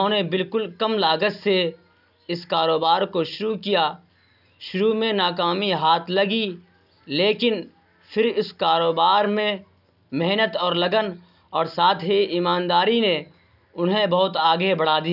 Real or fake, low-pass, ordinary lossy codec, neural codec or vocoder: real; 5.4 kHz; none; none